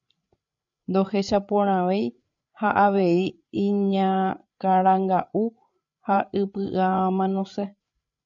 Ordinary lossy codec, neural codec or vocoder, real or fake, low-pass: MP3, 64 kbps; codec, 16 kHz, 8 kbps, FreqCodec, larger model; fake; 7.2 kHz